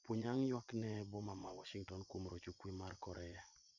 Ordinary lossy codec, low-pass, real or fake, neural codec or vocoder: none; 7.2 kHz; fake; vocoder, 24 kHz, 100 mel bands, Vocos